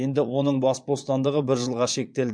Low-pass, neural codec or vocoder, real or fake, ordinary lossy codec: 9.9 kHz; vocoder, 22.05 kHz, 80 mel bands, Vocos; fake; MP3, 64 kbps